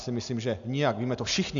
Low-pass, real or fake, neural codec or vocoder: 7.2 kHz; real; none